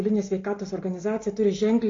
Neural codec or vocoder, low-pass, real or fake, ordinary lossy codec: none; 7.2 kHz; real; AAC, 32 kbps